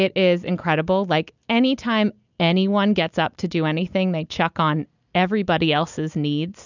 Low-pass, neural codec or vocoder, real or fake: 7.2 kHz; none; real